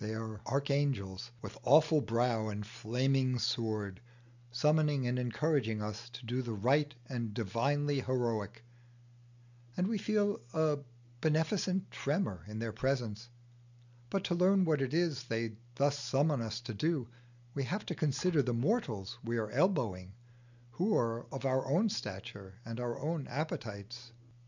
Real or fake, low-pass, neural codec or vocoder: real; 7.2 kHz; none